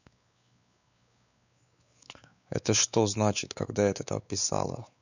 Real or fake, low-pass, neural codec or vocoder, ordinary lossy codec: fake; 7.2 kHz; codec, 16 kHz, 4 kbps, X-Codec, WavLM features, trained on Multilingual LibriSpeech; none